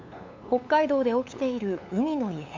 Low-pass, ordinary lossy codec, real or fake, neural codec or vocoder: 7.2 kHz; MP3, 64 kbps; fake; codec, 16 kHz, 8 kbps, FunCodec, trained on LibriTTS, 25 frames a second